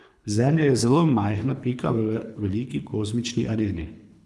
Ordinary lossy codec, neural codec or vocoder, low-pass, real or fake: none; codec, 24 kHz, 3 kbps, HILCodec; none; fake